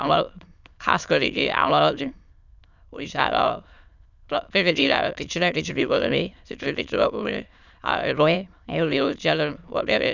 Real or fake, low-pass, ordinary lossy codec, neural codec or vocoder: fake; 7.2 kHz; none; autoencoder, 22.05 kHz, a latent of 192 numbers a frame, VITS, trained on many speakers